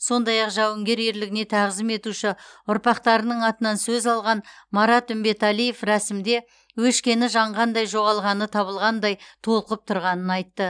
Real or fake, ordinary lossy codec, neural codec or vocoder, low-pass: real; none; none; 9.9 kHz